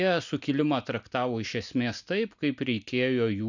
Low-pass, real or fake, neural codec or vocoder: 7.2 kHz; real; none